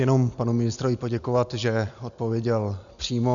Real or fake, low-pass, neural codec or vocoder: real; 7.2 kHz; none